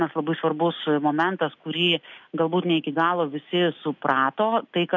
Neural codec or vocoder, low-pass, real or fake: none; 7.2 kHz; real